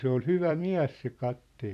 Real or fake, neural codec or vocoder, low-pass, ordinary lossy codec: fake; vocoder, 44.1 kHz, 128 mel bands every 256 samples, BigVGAN v2; 14.4 kHz; none